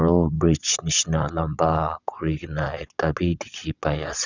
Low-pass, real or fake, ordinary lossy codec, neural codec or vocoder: 7.2 kHz; fake; none; vocoder, 22.05 kHz, 80 mel bands, WaveNeXt